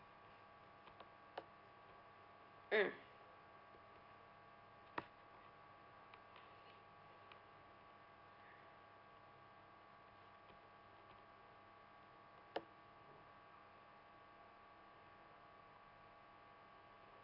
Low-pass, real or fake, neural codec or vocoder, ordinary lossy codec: 5.4 kHz; real; none; none